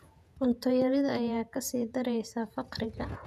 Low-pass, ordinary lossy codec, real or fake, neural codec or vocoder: 14.4 kHz; none; fake; vocoder, 48 kHz, 128 mel bands, Vocos